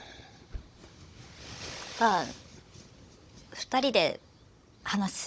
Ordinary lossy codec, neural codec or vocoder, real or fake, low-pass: none; codec, 16 kHz, 16 kbps, FunCodec, trained on Chinese and English, 50 frames a second; fake; none